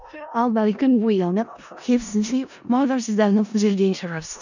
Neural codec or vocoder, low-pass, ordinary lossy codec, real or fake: codec, 16 kHz in and 24 kHz out, 0.4 kbps, LongCat-Audio-Codec, four codebook decoder; 7.2 kHz; none; fake